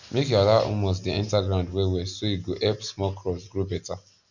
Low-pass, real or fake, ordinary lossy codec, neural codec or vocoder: 7.2 kHz; real; none; none